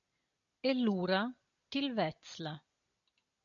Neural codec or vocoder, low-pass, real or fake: none; 7.2 kHz; real